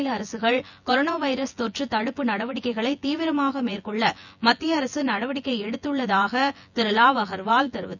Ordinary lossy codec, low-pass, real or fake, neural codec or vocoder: none; 7.2 kHz; fake; vocoder, 24 kHz, 100 mel bands, Vocos